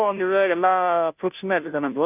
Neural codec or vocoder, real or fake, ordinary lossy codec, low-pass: codec, 16 kHz, 0.5 kbps, FunCodec, trained on Chinese and English, 25 frames a second; fake; none; 3.6 kHz